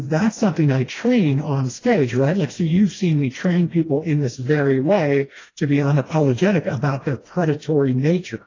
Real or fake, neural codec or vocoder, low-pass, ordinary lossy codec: fake; codec, 16 kHz, 1 kbps, FreqCodec, smaller model; 7.2 kHz; AAC, 32 kbps